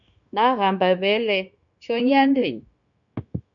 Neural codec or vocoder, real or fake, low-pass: codec, 16 kHz, 0.9 kbps, LongCat-Audio-Codec; fake; 7.2 kHz